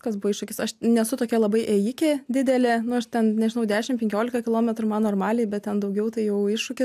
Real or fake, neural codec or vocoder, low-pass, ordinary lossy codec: real; none; 14.4 kHz; AAC, 96 kbps